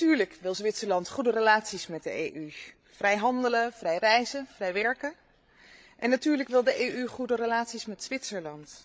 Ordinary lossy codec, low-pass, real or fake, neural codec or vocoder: none; none; fake; codec, 16 kHz, 16 kbps, FreqCodec, larger model